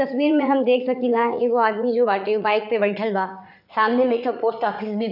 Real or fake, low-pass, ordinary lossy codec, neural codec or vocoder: fake; 5.4 kHz; none; vocoder, 44.1 kHz, 80 mel bands, Vocos